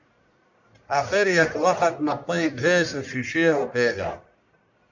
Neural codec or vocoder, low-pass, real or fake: codec, 44.1 kHz, 1.7 kbps, Pupu-Codec; 7.2 kHz; fake